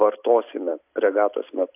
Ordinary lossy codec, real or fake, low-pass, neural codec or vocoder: AAC, 32 kbps; real; 3.6 kHz; none